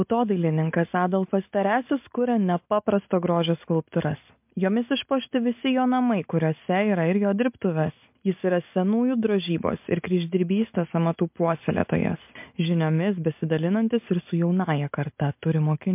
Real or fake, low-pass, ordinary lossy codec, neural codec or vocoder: real; 3.6 kHz; MP3, 32 kbps; none